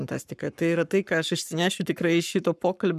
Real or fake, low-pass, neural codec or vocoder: fake; 14.4 kHz; codec, 44.1 kHz, 7.8 kbps, Pupu-Codec